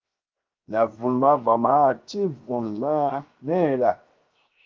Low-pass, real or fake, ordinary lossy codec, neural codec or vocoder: 7.2 kHz; fake; Opus, 32 kbps; codec, 16 kHz, 0.7 kbps, FocalCodec